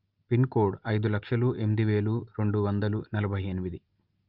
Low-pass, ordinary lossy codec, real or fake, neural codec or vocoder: 5.4 kHz; Opus, 24 kbps; real; none